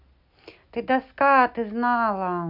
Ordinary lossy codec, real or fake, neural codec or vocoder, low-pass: none; real; none; 5.4 kHz